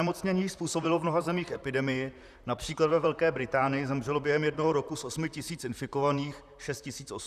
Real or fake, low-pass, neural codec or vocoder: fake; 14.4 kHz; vocoder, 44.1 kHz, 128 mel bands, Pupu-Vocoder